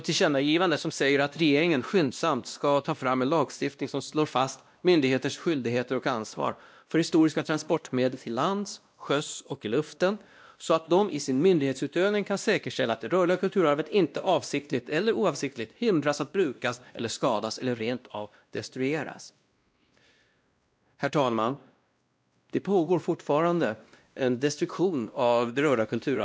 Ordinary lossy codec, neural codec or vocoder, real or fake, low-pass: none; codec, 16 kHz, 1 kbps, X-Codec, WavLM features, trained on Multilingual LibriSpeech; fake; none